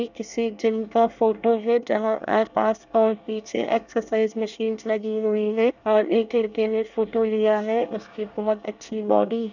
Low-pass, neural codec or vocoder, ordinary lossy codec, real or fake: 7.2 kHz; codec, 24 kHz, 1 kbps, SNAC; none; fake